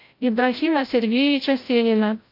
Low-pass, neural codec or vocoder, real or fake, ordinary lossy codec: 5.4 kHz; codec, 16 kHz, 0.5 kbps, FreqCodec, larger model; fake; AAC, 32 kbps